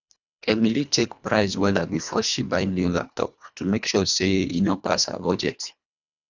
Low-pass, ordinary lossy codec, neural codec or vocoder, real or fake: 7.2 kHz; none; codec, 24 kHz, 1.5 kbps, HILCodec; fake